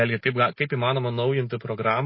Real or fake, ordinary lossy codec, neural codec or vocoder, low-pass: real; MP3, 24 kbps; none; 7.2 kHz